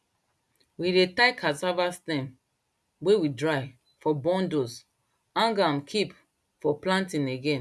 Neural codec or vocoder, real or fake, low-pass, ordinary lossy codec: none; real; none; none